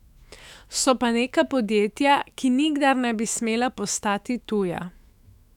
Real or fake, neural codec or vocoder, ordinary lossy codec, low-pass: fake; autoencoder, 48 kHz, 128 numbers a frame, DAC-VAE, trained on Japanese speech; none; 19.8 kHz